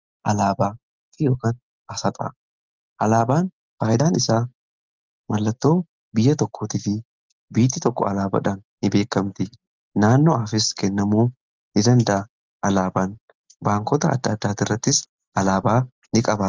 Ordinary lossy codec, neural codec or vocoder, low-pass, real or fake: Opus, 24 kbps; none; 7.2 kHz; real